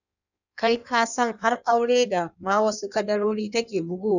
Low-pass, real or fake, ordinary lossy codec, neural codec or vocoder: 7.2 kHz; fake; none; codec, 16 kHz in and 24 kHz out, 1.1 kbps, FireRedTTS-2 codec